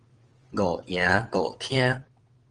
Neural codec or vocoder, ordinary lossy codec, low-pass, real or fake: vocoder, 22.05 kHz, 80 mel bands, WaveNeXt; Opus, 24 kbps; 9.9 kHz; fake